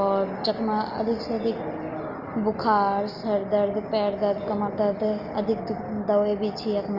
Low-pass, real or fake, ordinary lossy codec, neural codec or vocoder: 5.4 kHz; real; Opus, 24 kbps; none